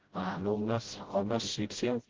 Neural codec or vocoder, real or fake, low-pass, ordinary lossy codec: codec, 16 kHz, 0.5 kbps, FreqCodec, smaller model; fake; 7.2 kHz; Opus, 16 kbps